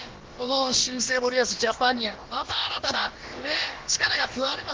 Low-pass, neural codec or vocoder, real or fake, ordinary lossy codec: 7.2 kHz; codec, 16 kHz, about 1 kbps, DyCAST, with the encoder's durations; fake; Opus, 16 kbps